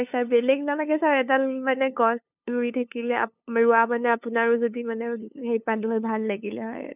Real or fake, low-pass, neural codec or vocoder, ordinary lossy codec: fake; 3.6 kHz; codec, 16 kHz, 2 kbps, FunCodec, trained on LibriTTS, 25 frames a second; none